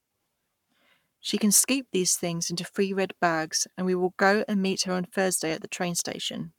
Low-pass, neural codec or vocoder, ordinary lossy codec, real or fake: 19.8 kHz; codec, 44.1 kHz, 7.8 kbps, Pupu-Codec; none; fake